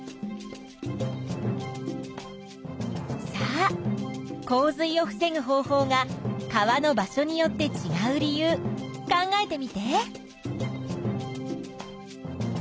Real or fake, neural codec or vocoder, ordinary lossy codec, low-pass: real; none; none; none